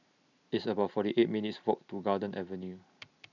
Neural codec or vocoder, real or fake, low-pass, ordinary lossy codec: none; real; 7.2 kHz; none